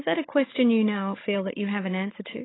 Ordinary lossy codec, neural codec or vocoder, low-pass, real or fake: AAC, 16 kbps; codec, 16 kHz, 16 kbps, FunCodec, trained on Chinese and English, 50 frames a second; 7.2 kHz; fake